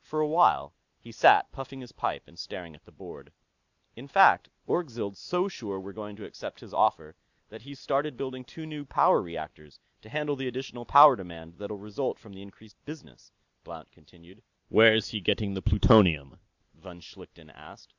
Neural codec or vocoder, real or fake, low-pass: none; real; 7.2 kHz